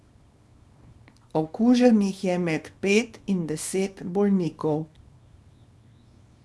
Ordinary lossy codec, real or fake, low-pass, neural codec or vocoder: none; fake; none; codec, 24 kHz, 0.9 kbps, WavTokenizer, small release